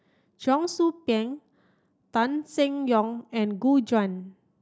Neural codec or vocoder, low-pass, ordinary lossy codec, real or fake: none; none; none; real